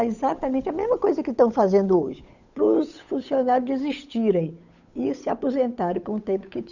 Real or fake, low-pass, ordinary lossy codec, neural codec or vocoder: fake; 7.2 kHz; none; codec, 16 kHz, 8 kbps, FunCodec, trained on Chinese and English, 25 frames a second